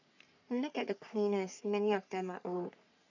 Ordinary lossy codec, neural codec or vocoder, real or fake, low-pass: none; codec, 44.1 kHz, 3.4 kbps, Pupu-Codec; fake; 7.2 kHz